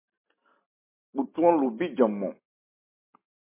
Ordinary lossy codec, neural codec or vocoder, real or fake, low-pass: MP3, 24 kbps; none; real; 3.6 kHz